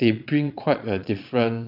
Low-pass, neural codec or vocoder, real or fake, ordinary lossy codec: 5.4 kHz; vocoder, 22.05 kHz, 80 mel bands, Vocos; fake; none